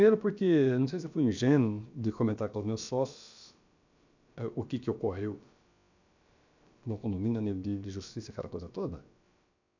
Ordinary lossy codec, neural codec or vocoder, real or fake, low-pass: none; codec, 16 kHz, about 1 kbps, DyCAST, with the encoder's durations; fake; 7.2 kHz